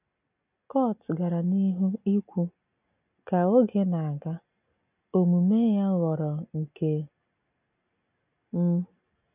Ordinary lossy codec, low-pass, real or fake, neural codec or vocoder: none; 3.6 kHz; real; none